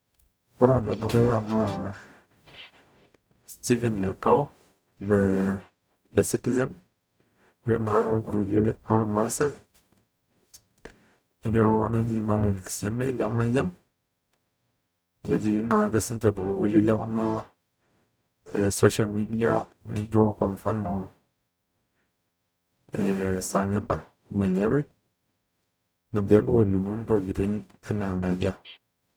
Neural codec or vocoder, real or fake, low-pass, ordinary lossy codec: codec, 44.1 kHz, 0.9 kbps, DAC; fake; none; none